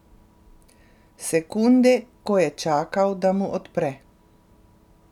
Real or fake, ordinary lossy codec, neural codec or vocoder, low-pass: real; none; none; 19.8 kHz